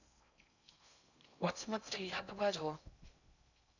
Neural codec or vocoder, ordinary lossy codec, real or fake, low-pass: codec, 16 kHz in and 24 kHz out, 0.6 kbps, FocalCodec, streaming, 4096 codes; none; fake; 7.2 kHz